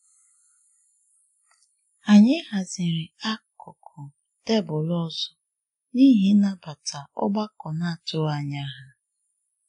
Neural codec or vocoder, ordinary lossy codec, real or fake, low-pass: none; AAC, 48 kbps; real; 10.8 kHz